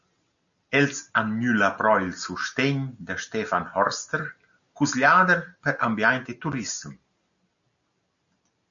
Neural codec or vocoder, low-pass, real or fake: none; 7.2 kHz; real